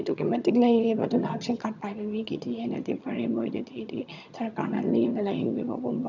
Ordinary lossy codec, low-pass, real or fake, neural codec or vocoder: none; 7.2 kHz; fake; vocoder, 22.05 kHz, 80 mel bands, HiFi-GAN